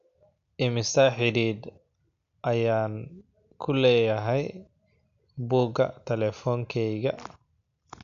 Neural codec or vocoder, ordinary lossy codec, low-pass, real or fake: none; AAC, 96 kbps; 7.2 kHz; real